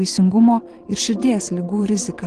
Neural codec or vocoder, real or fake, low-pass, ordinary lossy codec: vocoder, 22.05 kHz, 80 mel bands, Vocos; fake; 9.9 kHz; Opus, 16 kbps